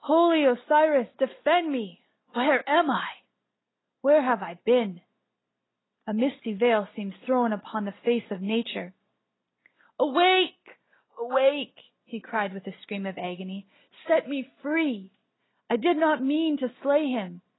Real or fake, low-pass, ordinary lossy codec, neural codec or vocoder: real; 7.2 kHz; AAC, 16 kbps; none